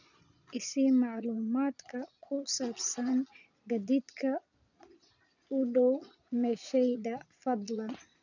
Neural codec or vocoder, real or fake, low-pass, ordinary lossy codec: vocoder, 22.05 kHz, 80 mel bands, Vocos; fake; 7.2 kHz; none